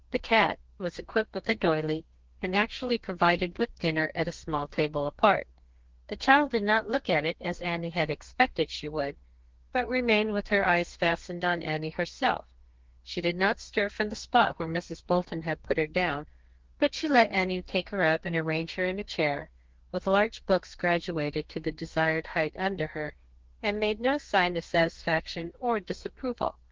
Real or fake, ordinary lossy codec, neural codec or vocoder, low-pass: fake; Opus, 16 kbps; codec, 32 kHz, 1.9 kbps, SNAC; 7.2 kHz